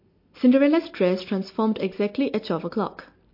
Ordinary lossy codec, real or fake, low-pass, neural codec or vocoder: MP3, 32 kbps; real; 5.4 kHz; none